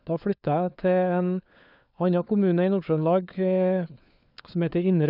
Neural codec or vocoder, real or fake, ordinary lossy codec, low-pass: codec, 16 kHz, 4 kbps, FunCodec, trained on LibriTTS, 50 frames a second; fake; none; 5.4 kHz